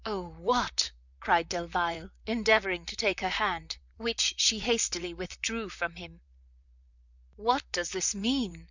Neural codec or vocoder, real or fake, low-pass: vocoder, 44.1 kHz, 128 mel bands, Pupu-Vocoder; fake; 7.2 kHz